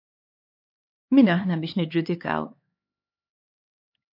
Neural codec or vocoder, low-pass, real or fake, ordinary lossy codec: codec, 16 kHz, 4 kbps, X-Codec, HuBERT features, trained on LibriSpeech; 5.4 kHz; fake; MP3, 32 kbps